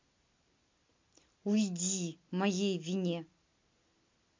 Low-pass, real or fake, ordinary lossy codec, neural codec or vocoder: 7.2 kHz; real; MP3, 48 kbps; none